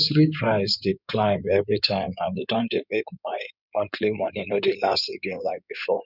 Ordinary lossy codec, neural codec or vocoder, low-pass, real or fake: none; codec, 16 kHz in and 24 kHz out, 2.2 kbps, FireRedTTS-2 codec; 5.4 kHz; fake